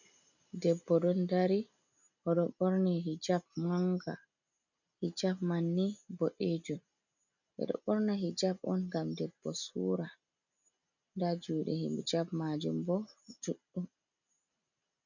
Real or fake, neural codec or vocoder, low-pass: real; none; 7.2 kHz